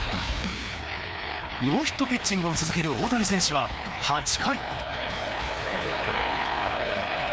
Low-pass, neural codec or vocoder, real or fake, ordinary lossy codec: none; codec, 16 kHz, 2 kbps, FreqCodec, larger model; fake; none